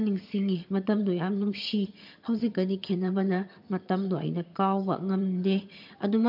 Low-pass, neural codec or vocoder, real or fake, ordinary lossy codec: 5.4 kHz; vocoder, 22.05 kHz, 80 mel bands, HiFi-GAN; fake; MP3, 48 kbps